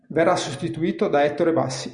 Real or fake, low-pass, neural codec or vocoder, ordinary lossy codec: real; 9.9 kHz; none; Opus, 64 kbps